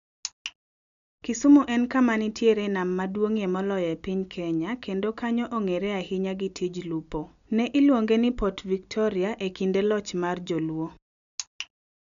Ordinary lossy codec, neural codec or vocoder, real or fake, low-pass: none; none; real; 7.2 kHz